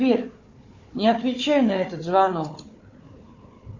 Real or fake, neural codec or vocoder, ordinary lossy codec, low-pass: fake; codec, 16 kHz, 4 kbps, FunCodec, trained on Chinese and English, 50 frames a second; AAC, 48 kbps; 7.2 kHz